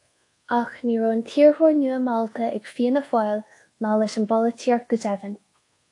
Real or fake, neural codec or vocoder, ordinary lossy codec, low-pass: fake; codec, 24 kHz, 1.2 kbps, DualCodec; AAC, 48 kbps; 10.8 kHz